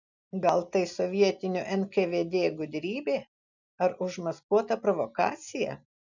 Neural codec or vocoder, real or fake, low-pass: none; real; 7.2 kHz